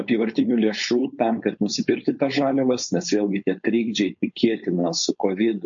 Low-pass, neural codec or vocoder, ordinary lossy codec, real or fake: 7.2 kHz; codec, 16 kHz, 4.8 kbps, FACodec; MP3, 48 kbps; fake